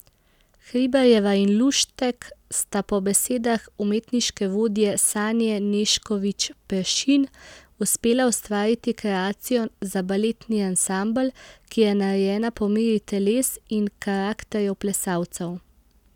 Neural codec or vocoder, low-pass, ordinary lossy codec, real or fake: none; 19.8 kHz; none; real